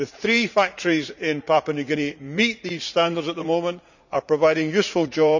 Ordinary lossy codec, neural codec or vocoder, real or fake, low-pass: none; vocoder, 44.1 kHz, 80 mel bands, Vocos; fake; 7.2 kHz